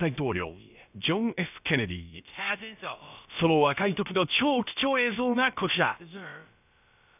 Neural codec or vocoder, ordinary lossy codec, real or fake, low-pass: codec, 16 kHz, about 1 kbps, DyCAST, with the encoder's durations; none; fake; 3.6 kHz